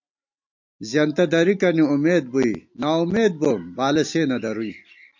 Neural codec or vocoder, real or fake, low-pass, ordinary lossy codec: none; real; 7.2 kHz; MP3, 48 kbps